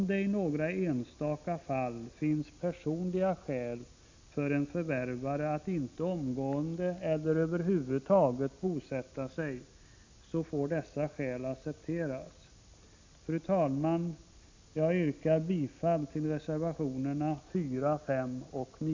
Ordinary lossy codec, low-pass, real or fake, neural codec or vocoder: MP3, 64 kbps; 7.2 kHz; real; none